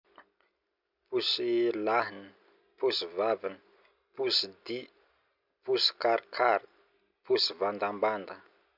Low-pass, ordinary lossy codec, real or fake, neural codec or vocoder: 5.4 kHz; none; real; none